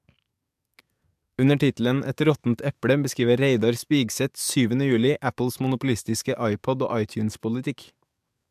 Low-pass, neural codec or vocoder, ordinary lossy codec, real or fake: 14.4 kHz; codec, 44.1 kHz, 7.8 kbps, DAC; MP3, 96 kbps; fake